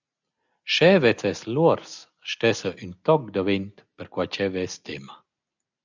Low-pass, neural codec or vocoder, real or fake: 7.2 kHz; none; real